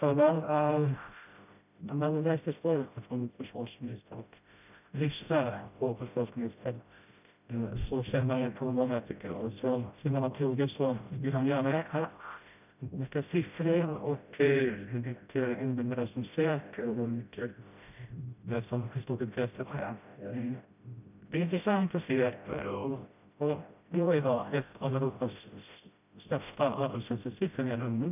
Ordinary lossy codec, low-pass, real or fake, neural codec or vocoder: none; 3.6 kHz; fake; codec, 16 kHz, 0.5 kbps, FreqCodec, smaller model